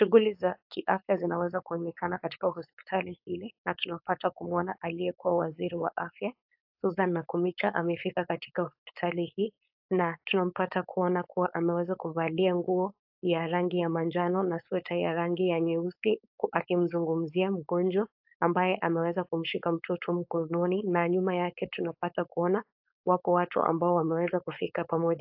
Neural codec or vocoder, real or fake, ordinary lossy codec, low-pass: codec, 16 kHz, 4.8 kbps, FACodec; fake; AAC, 48 kbps; 5.4 kHz